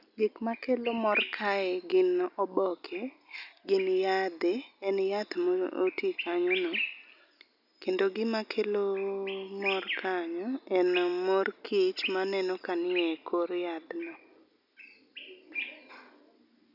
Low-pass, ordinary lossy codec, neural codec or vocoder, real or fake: 5.4 kHz; none; none; real